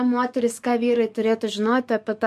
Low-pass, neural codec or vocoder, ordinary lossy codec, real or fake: 14.4 kHz; codec, 44.1 kHz, 7.8 kbps, DAC; MP3, 64 kbps; fake